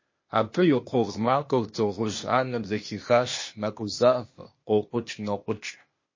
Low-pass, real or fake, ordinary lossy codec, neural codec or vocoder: 7.2 kHz; fake; MP3, 32 kbps; codec, 16 kHz, 0.8 kbps, ZipCodec